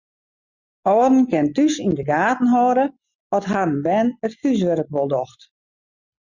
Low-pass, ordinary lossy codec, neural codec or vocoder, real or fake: 7.2 kHz; Opus, 64 kbps; none; real